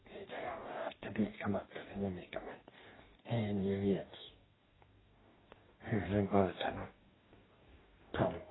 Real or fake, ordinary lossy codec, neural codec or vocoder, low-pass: fake; AAC, 16 kbps; codec, 44.1 kHz, 2.6 kbps, DAC; 7.2 kHz